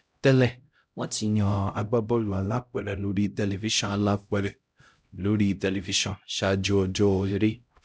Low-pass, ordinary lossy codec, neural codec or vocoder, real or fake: none; none; codec, 16 kHz, 0.5 kbps, X-Codec, HuBERT features, trained on LibriSpeech; fake